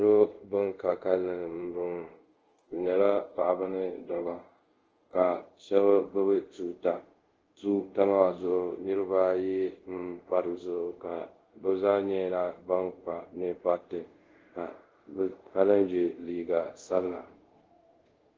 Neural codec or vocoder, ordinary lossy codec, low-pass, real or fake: codec, 24 kHz, 0.5 kbps, DualCodec; Opus, 16 kbps; 7.2 kHz; fake